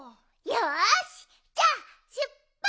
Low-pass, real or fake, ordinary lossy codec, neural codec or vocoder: none; real; none; none